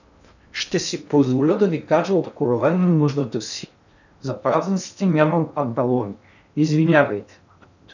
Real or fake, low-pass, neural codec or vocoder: fake; 7.2 kHz; codec, 16 kHz in and 24 kHz out, 0.6 kbps, FocalCodec, streaming, 2048 codes